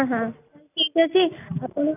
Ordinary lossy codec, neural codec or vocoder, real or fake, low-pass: none; none; real; 3.6 kHz